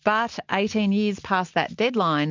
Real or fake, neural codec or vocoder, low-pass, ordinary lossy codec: real; none; 7.2 kHz; MP3, 48 kbps